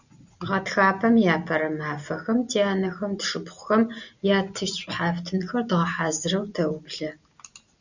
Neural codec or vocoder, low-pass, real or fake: none; 7.2 kHz; real